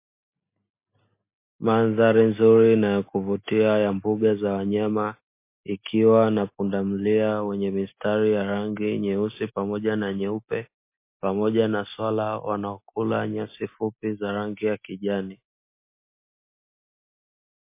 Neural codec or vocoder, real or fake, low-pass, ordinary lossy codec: none; real; 3.6 kHz; MP3, 24 kbps